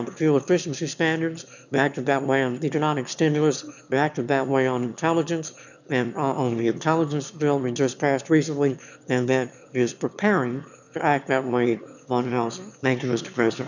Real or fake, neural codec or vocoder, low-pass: fake; autoencoder, 22.05 kHz, a latent of 192 numbers a frame, VITS, trained on one speaker; 7.2 kHz